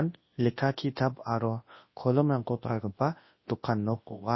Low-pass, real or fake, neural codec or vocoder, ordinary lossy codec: 7.2 kHz; fake; codec, 24 kHz, 0.9 kbps, WavTokenizer, large speech release; MP3, 24 kbps